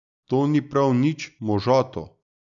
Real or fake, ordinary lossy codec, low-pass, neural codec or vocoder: real; none; 7.2 kHz; none